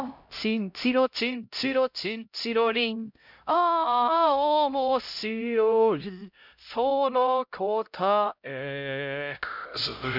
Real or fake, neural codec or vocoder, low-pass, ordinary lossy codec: fake; codec, 16 kHz, 0.5 kbps, X-Codec, HuBERT features, trained on LibriSpeech; 5.4 kHz; none